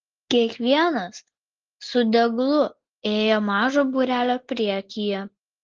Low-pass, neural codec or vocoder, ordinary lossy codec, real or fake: 7.2 kHz; none; Opus, 16 kbps; real